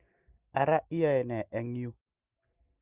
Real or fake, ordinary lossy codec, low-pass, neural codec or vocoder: real; Opus, 32 kbps; 3.6 kHz; none